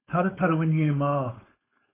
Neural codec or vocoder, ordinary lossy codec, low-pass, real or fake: codec, 16 kHz, 4.8 kbps, FACodec; AAC, 16 kbps; 3.6 kHz; fake